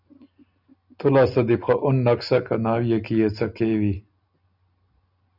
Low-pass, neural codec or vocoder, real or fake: 5.4 kHz; none; real